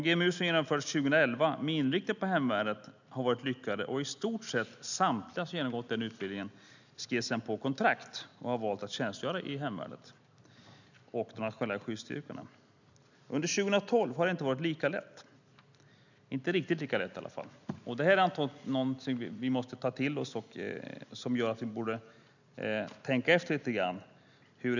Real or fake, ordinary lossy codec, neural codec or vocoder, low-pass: real; none; none; 7.2 kHz